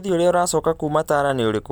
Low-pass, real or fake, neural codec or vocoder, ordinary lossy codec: none; real; none; none